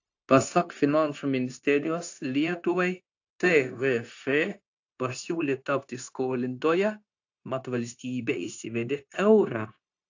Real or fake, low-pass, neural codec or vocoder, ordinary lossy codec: fake; 7.2 kHz; codec, 16 kHz, 0.9 kbps, LongCat-Audio-Codec; AAC, 48 kbps